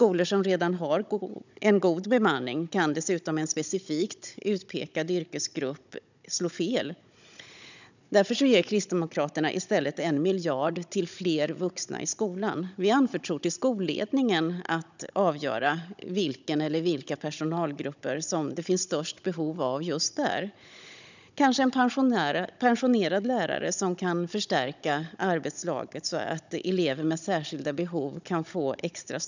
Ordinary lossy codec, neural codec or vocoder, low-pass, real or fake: none; codec, 16 kHz, 16 kbps, FunCodec, trained on Chinese and English, 50 frames a second; 7.2 kHz; fake